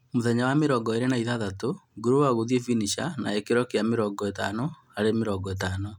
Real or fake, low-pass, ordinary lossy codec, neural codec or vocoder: real; 19.8 kHz; none; none